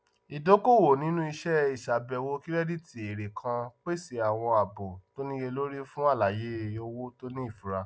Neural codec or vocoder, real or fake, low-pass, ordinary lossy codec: none; real; none; none